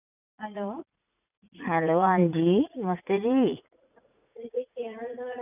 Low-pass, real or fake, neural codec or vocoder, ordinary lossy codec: 3.6 kHz; fake; vocoder, 44.1 kHz, 80 mel bands, Vocos; none